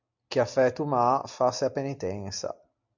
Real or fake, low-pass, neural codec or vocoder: real; 7.2 kHz; none